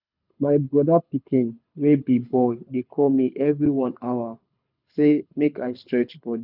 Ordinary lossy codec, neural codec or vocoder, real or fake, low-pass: none; codec, 24 kHz, 6 kbps, HILCodec; fake; 5.4 kHz